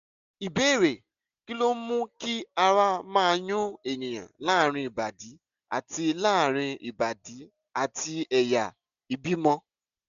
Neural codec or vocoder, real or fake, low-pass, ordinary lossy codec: none; real; 7.2 kHz; none